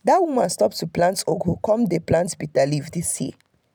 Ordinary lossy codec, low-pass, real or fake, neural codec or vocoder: none; none; real; none